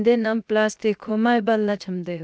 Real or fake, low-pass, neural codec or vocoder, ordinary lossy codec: fake; none; codec, 16 kHz, 0.7 kbps, FocalCodec; none